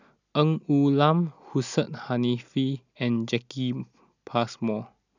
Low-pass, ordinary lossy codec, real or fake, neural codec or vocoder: 7.2 kHz; none; real; none